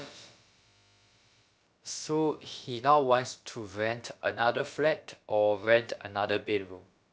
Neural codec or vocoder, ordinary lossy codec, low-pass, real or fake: codec, 16 kHz, about 1 kbps, DyCAST, with the encoder's durations; none; none; fake